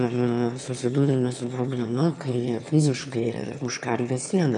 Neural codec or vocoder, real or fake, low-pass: autoencoder, 22.05 kHz, a latent of 192 numbers a frame, VITS, trained on one speaker; fake; 9.9 kHz